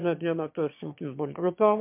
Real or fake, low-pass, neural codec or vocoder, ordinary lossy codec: fake; 3.6 kHz; autoencoder, 22.05 kHz, a latent of 192 numbers a frame, VITS, trained on one speaker; MP3, 32 kbps